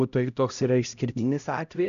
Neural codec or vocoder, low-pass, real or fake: codec, 16 kHz, 0.5 kbps, X-Codec, HuBERT features, trained on LibriSpeech; 7.2 kHz; fake